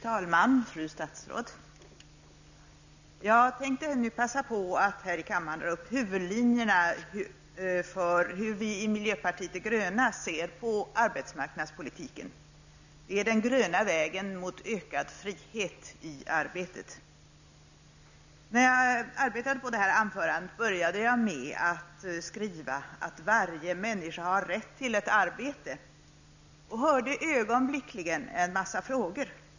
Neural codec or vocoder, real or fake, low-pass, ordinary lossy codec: none; real; 7.2 kHz; none